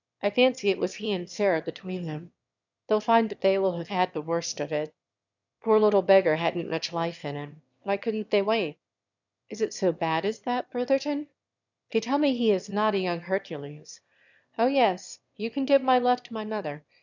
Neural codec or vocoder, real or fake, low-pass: autoencoder, 22.05 kHz, a latent of 192 numbers a frame, VITS, trained on one speaker; fake; 7.2 kHz